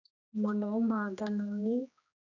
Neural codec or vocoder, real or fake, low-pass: codec, 16 kHz, 1 kbps, X-Codec, HuBERT features, trained on general audio; fake; 7.2 kHz